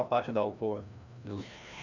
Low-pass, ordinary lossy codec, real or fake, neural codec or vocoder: 7.2 kHz; none; fake; codec, 16 kHz, 1 kbps, FreqCodec, larger model